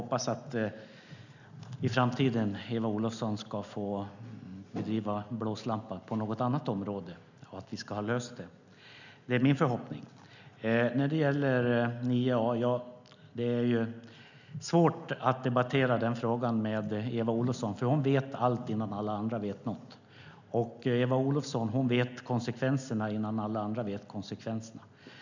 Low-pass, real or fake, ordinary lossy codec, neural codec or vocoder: 7.2 kHz; real; none; none